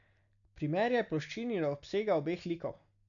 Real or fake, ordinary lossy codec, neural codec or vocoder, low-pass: real; none; none; 7.2 kHz